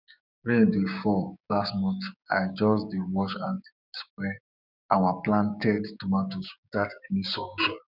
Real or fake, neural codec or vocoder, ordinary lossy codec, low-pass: fake; codec, 16 kHz, 6 kbps, DAC; none; 5.4 kHz